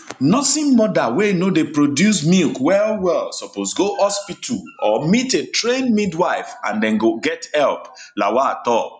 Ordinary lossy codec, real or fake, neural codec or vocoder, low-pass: none; real; none; 9.9 kHz